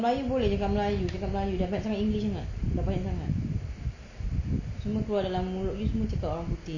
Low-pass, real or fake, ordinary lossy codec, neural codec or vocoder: 7.2 kHz; real; none; none